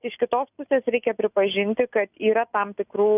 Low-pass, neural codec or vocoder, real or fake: 3.6 kHz; none; real